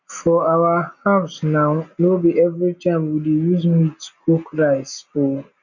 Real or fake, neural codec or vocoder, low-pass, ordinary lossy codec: real; none; 7.2 kHz; none